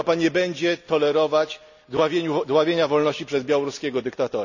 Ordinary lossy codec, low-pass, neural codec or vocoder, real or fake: none; 7.2 kHz; none; real